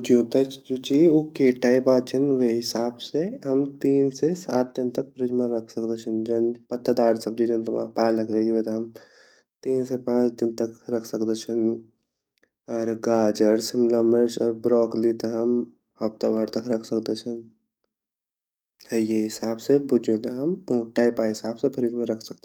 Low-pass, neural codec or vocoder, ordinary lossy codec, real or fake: 19.8 kHz; codec, 44.1 kHz, 7.8 kbps, DAC; none; fake